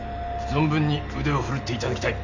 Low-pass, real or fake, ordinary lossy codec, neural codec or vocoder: 7.2 kHz; real; none; none